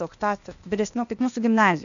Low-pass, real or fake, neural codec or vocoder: 7.2 kHz; fake; codec, 16 kHz, 0.8 kbps, ZipCodec